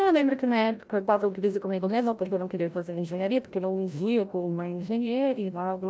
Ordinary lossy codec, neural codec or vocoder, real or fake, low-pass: none; codec, 16 kHz, 0.5 kbps, FreqCodec, larger model; fake; none